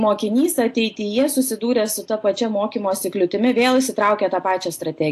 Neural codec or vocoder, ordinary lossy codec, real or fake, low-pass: none; AAC, 64 kbps; real; 14.4 kHz